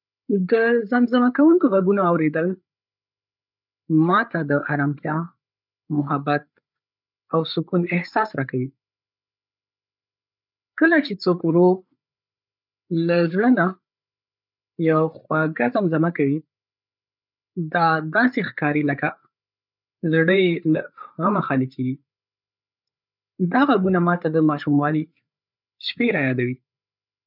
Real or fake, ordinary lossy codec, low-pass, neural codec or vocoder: fake; none; 5.4 kHz; codec, 16 kHz, 8 kbps, FreqCodec, larger model